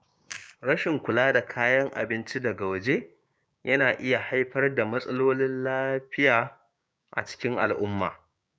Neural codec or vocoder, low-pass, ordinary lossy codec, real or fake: codec, 16 kHz, 6 kbps, DAC; none; none; fake